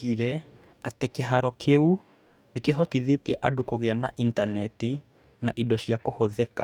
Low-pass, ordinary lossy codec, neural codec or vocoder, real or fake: 19.8 kHz; none; codec, 44.1 kHz, 2.6 kbps, DAC; fake